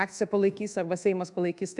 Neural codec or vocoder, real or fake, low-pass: codec, 24 kHz, 1.2 kbps, DualCodec; fake; 10.8 kHz